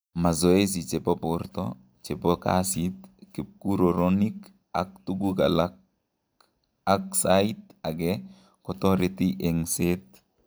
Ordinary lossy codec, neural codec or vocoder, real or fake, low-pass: none; none; real; none